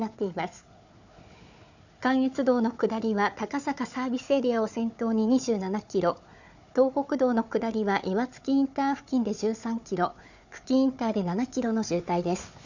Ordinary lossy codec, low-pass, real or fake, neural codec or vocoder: none; 7.2 kHz; fake; codec, 16 kHz, 4 kbps, FunCodec, trained on Chinese and English, 50 frames a second